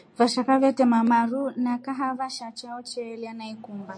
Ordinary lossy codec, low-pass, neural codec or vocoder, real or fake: MP3, 48 kbps; 9.9 kHz; none; real